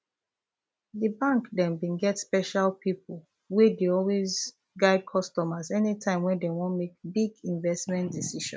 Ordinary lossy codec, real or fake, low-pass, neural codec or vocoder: none; real; none; none